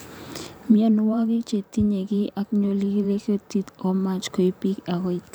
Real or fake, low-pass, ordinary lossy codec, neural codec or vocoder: fake; none; none; vocoder, 44.1 kHz, 128 mel bands every 512 samples, BigVGAN v2